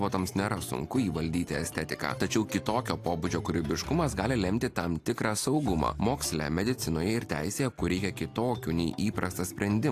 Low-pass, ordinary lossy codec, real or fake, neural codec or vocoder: 14.4 kHz; AAC, 64 kbps; real; none